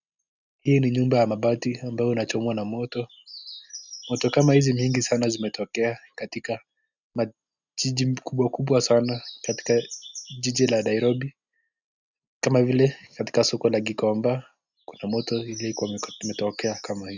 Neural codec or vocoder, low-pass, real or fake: none; 7.2 kHz; real